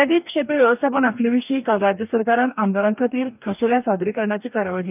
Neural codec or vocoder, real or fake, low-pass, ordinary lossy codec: codec, 44.1 kHz, 2.6 kbps, DAC; fake; 3.6 kHz; none